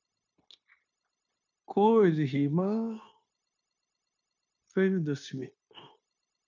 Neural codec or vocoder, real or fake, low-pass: codec, 16 kHz, 0.9 kbps, LongCat-Audio-Codec; fake; 7.2 kHz